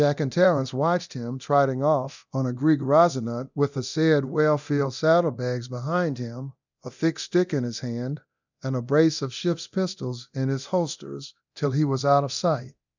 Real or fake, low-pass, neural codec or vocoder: fake; 7.2 kHz; codec, 24 kHz, 0.9 kbps, DualCodec